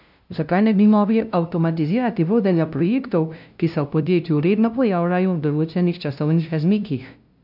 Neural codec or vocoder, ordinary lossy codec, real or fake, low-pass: codec, 16 kHz, 0.5 kbps, FunCodec, trained on LibriTTS, 25 frames a second; none; fake; 5.4 kHz